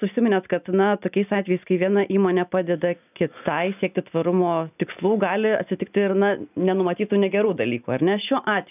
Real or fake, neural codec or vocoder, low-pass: real; none; 3.6 kHz